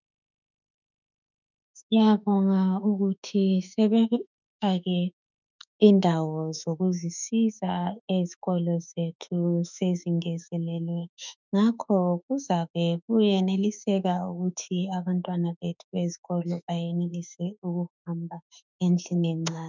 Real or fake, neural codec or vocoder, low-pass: fake; autoencoder, 48 kHz, 32 numbers a frame, DAC-VAE, trained on Japanese speech; 7.2 kHz